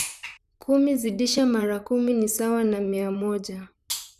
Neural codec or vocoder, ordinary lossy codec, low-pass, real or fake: vocoder, 44.1 kHz, 128 mel bands, Pupu-Vocoder; none; 14.4 kHz; fake